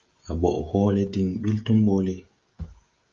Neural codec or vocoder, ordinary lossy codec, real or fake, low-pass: codec, 16 kHz, 16 kbps, FreqCodec, smaller model; Opus, 32 kbps; fake; 7.2 kHz